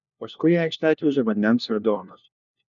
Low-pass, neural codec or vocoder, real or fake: 7.2 kHz; codec, 16 kHz, 1 kbps, FunCodec, trained on LibriTTS, 50 frames a second; fake